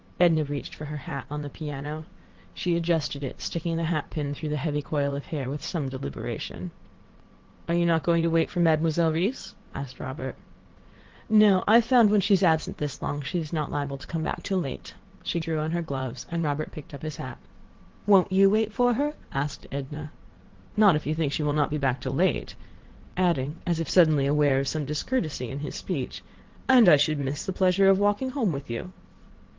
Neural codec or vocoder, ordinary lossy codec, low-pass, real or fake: vocoder, 22.05 kHz, 80 mel bands, WaveNeXt; Opus, 16 kbps; 7.2 kHz; fake